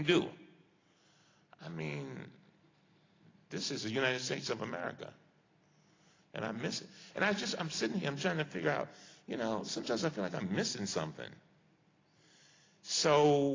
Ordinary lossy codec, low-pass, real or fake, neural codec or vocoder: AAC, 32 kbps; 7.2 kHz; real; none